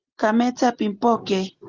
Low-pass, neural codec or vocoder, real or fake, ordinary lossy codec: 7.2 kHz; none; real; Opus, 16 kbps